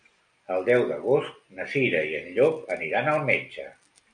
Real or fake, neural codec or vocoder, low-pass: real; none; 9.9 kHz